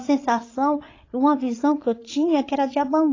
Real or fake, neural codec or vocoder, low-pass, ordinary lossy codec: fake; codec, 16 kHz, 16 kbps, FreqCodec, larger model; 7.2 kHz; AAC, 32 kbps